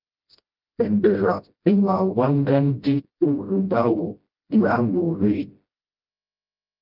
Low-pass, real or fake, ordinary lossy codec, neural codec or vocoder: 5.4 kHz; fake; Opus, 24 kbps; codec, 16 kHz, 0.5 kbps, FreqCodec, smaller model